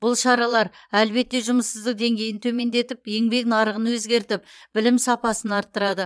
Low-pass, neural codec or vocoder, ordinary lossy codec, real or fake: none; vocoder, 22.05 kHz, 80 mel bands, Vocos; none; fake